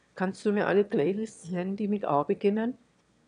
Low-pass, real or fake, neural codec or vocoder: 9.9 kHz; fake; autoencoder, 22.05 kHz, a latent of 192 numbers a frame, VITS, trained on one speaker